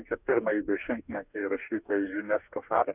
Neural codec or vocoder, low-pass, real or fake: codec, 44.1 kHz, 2.6 kbps, DAC; 3.6 kHz; fake